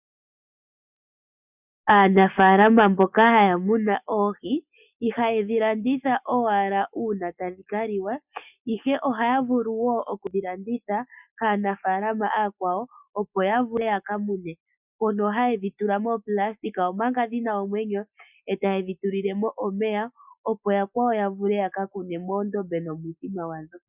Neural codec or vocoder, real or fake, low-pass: none; real; 3.6 kHz